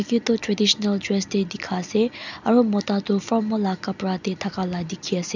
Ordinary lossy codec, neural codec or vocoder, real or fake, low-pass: none; none; real; 7.2 kHz